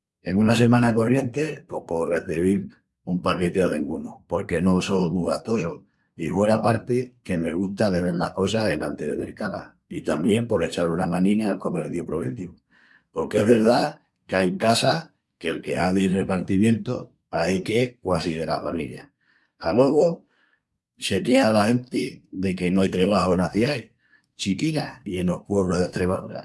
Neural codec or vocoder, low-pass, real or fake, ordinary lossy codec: codec, 24 kHz, 1 kbps, SNAC; none; fake; none